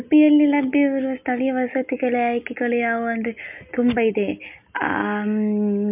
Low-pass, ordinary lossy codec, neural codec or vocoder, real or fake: 3.6 kHz; MP3, 32 kbps; none; real